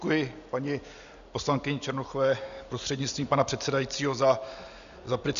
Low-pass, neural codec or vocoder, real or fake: 7.2 kHz; none; real